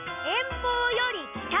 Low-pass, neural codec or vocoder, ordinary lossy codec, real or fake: 3.6 kHz; none; none; real